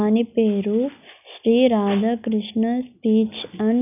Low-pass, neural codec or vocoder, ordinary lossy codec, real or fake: 3.6 kHz; none; none; real